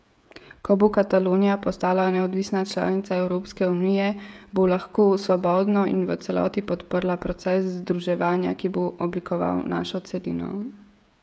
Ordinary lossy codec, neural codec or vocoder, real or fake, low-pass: none; codec, 16 kHz, 16 kbps, FreqCodec, smaller model; fake; none